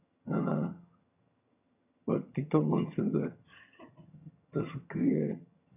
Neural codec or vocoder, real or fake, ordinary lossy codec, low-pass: vocoder, 22.05 kHz, 80 mel bands, HiFi-GAN; fake; none; 3.6 kHz